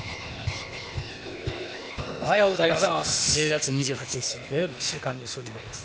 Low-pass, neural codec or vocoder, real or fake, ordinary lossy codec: none; codec, 16 kHz, 0.8 kbps, ZipCodec; fake; none